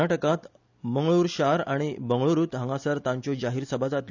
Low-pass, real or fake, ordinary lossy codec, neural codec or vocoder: 7.2 kHz; real; none; none